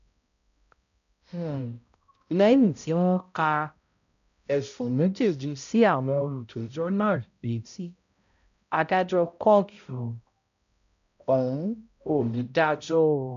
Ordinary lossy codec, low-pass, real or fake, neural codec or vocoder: none; 7.2 kHz; fake; codec, 16 kHz, 0.5 kbps, X-Codec, HuBERT features, trained on balanced general audio